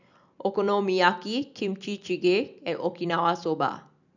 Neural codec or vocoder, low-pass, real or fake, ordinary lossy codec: none; 7.2 kHz; real; none